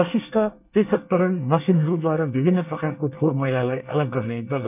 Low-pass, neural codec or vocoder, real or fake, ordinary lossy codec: 3.6 kHz; codec, 24 kHz, 1 kbps, SNAC; fake; none